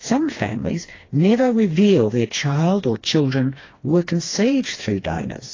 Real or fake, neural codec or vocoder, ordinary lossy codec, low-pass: fake; codec, 16 kHz, 2 kbps, FreqCodec, smaller model; AAC, 32 kbps; 7.2 kHz